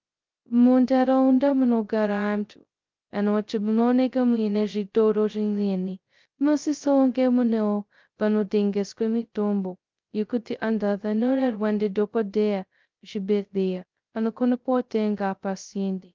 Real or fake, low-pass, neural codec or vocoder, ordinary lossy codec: fake; 7.2 kHz; codec, 16 kHz, 0.2 kbps, FocalCodec; Opus, 32 kbps